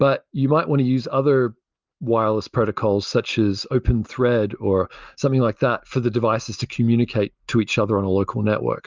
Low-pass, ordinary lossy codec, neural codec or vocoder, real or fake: 7.2 kHz; Opus, 24 kbps; none; real